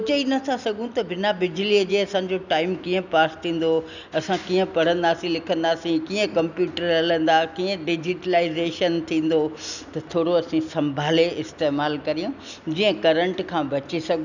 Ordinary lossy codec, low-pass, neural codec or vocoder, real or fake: none; 7.2 kHz; none; real